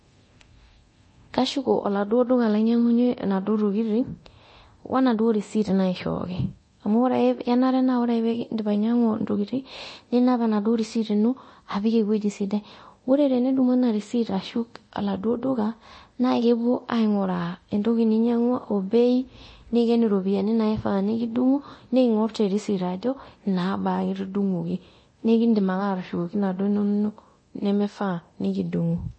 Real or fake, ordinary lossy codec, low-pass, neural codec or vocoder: fake; MP3, 32 kbps; 9.9 kHz; codec, 24 kHz, 0.9 kbps, DualCodec